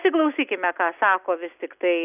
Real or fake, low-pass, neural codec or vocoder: real; 3.6 kHz; none